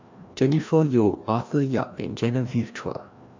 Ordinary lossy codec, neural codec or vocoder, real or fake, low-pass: MP3, 64 kbps; codec, 16 kHz, 1 kbps, FreqCodec, larger model; fake; 7.2 kHz